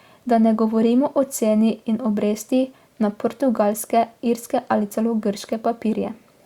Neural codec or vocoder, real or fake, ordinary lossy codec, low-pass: none; real; Opus, 64 kbps; 19.8 kHz